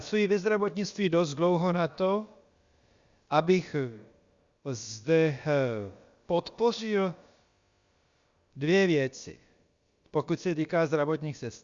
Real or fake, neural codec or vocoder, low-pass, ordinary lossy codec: fake; codec, 16 kHz, about 1 kbps, DyCAST, with the encoder's durations; 7.2 kHz; Opus, 64 kbps